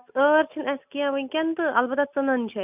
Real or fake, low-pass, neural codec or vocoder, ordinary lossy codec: real; 3.6 kHz; none; none